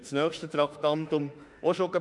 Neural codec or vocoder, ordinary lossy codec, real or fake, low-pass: autoencoder, 48 kHz, 32 numbers a frame, DAC-VAE, trained on Japanese speech; none; fake; 10.8 kHz